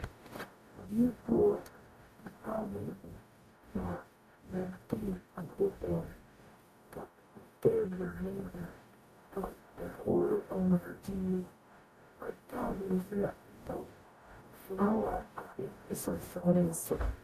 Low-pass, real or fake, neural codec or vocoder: 14.4 kHz; fake; codec, 44.1 kHz, 0.9 kbps, DAC